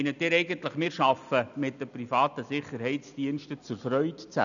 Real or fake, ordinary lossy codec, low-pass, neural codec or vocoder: real; none; 7.2 kHz; none